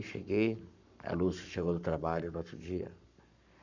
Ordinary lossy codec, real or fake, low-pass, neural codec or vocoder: none; fake; 7.2 kHz; codec, 44.1 kHz, 7.8 kbps, Pupu-Codec